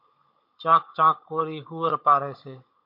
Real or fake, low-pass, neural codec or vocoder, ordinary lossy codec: fake; 5.4 kHz; codec, 16 kHz, 16 kbps, FunCodec, trained on Chinese and English, 50 frames a second; MP3, 32 kbps